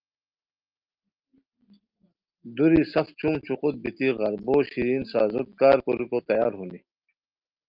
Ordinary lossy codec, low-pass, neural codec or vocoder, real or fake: Opus, 24 kbps; 5.4 kHz; none; real